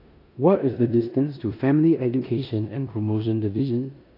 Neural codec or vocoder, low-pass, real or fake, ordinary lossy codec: codec, 16 kHz in and 24 kHz out, 0.9 kbps, LongCat-Audio-Codec, four codebook decoder; 5.4 kHz; fake; AAC, 32 kbps